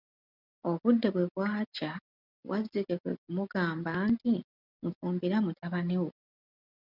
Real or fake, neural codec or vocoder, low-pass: real; none; 5.4 kHz